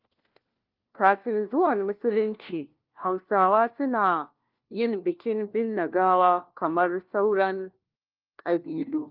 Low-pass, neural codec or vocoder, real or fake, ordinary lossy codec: 5.4 kHz; codec, 16 kHz, 1 kbps, FunCodec, trained on LibriTTS, 50 frames a second; fake; Opus, 32 kbps